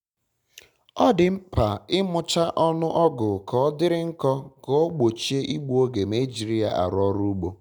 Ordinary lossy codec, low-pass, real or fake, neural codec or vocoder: none; 19.8 kHz; real; none